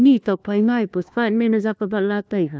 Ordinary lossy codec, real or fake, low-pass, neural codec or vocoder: none; fake; none; codec, 16 kHz, 1 kbps, FunCodec, trained on LibriTTS, 50 frames a second